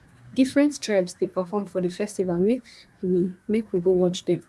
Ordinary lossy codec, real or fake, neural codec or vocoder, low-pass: none; fake; codec, 24 kHz, 1 kbps, SNAC; none